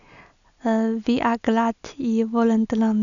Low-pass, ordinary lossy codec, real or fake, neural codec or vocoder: 7.2 kHz; none; real; none